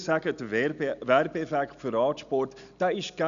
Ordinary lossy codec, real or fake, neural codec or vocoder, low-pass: MP3, 96 kbps; real; none; 7.2 kHz